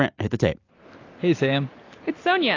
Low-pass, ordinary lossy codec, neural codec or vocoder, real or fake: 7.2 kHz; AAC, 32 kbps; none; real